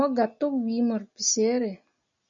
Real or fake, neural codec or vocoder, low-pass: real; none; 7.2 kHz